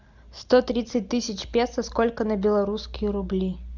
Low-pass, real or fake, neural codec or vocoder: 7.2 kHz; real; none